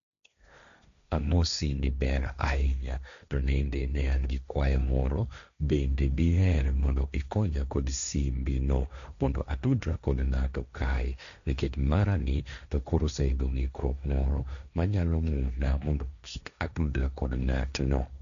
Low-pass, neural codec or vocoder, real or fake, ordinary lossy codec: 7.2 kHz; codec, 16 kHz, 1.1 kbps, Voila-Tokenizer; fake; none